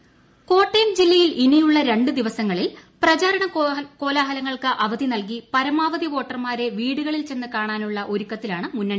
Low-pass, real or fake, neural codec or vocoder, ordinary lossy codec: none; real; none; none